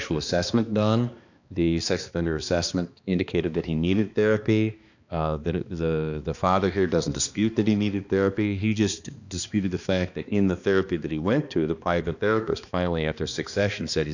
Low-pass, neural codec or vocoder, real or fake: 7.2 kHz; codec, 16 kHz, 2 kbps, X-Codec, HuBERT features, trained on balanced general audio; fake